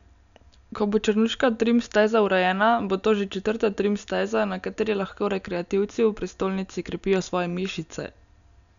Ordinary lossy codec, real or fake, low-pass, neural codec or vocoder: none; real; 7.2 kHz; none